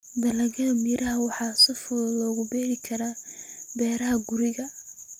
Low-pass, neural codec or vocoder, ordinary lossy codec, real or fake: 19.8 kHz; none; none; real